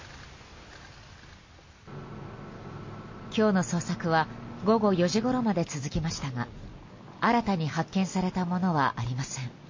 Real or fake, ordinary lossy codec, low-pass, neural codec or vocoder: real; MP3, 32 kbps; 7.2 kHz; none